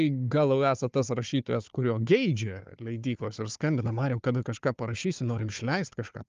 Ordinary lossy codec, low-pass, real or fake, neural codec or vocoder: Opus, 32 kbps; 7.2 kHz; fake; codec, 16 kHz, 4 kbps, FunCodec, trained on Chinese and English, 50 frames a second